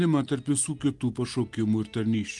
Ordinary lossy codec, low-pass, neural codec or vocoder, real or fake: Opus, 24 kbps; 10.8 kHz; none; real